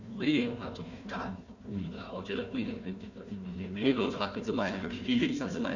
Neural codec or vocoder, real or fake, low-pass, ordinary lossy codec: codec, 16 kHz, 1 kbps, FunCodec, trained on Chinese and English, 50 frames a second; fake; 7.2 kHz; none